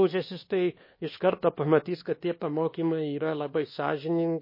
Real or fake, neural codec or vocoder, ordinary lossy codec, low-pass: fake; codec, 24 kHz, 1.2 kbps, DualCodec; MP3, 24 kbps; 5.4 kHz